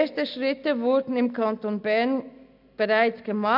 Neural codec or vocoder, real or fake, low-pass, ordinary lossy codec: none; real; 5.4 kHz; AAC, 48 kbps